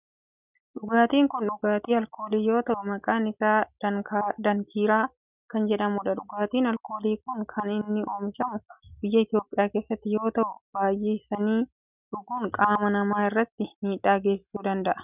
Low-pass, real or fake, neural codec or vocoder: 3.6 kHz; real; none